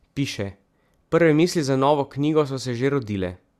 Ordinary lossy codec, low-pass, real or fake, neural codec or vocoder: Opus, 64 kbps; 14.4 kHz; real; none